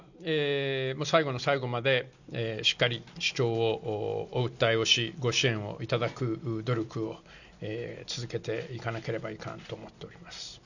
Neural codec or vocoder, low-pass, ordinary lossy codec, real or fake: none; 7.2 kHz; none; real